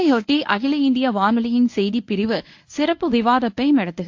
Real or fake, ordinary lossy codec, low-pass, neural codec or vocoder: fake; AAC, 48 kbps; 7.2 kHz; codec, 24 kHz, 0.9 kbps, WavTokenizer, medium speech release version 1